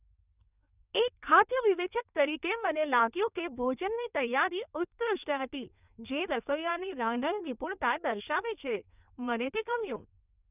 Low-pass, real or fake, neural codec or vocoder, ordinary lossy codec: 3.6 kHz; fake; codec, 16 kHz in and 24 kHz out, 1.1 kbps, FireRedTTS-2 codec; none